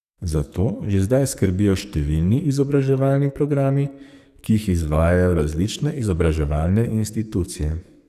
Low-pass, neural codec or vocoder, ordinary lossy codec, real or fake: 14.4 kHz; codec, 44.1 kHz, 2.6 kbps, SNAC; none; fake